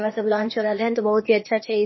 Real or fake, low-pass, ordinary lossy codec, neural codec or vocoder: fake; 7.2 kHz; MP3, 24 kbps; codec, 16 kHz, 0.8 kbps, ZipCodec